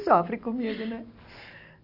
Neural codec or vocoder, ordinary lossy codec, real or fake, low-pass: none; none; real; 5.4 kHz